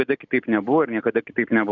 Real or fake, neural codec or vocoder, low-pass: real; none; 7.2 kHz